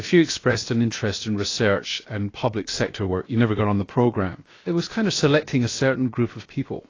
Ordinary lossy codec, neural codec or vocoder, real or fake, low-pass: AAC, 32 kbps; codec, 16 kHz, 0.7 kbps, FocalCodec; fake; 7.2 kHz